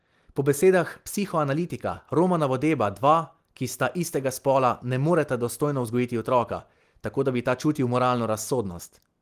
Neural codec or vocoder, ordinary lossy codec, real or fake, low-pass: none; Opus, 24 kbps; real; 14.4 kHz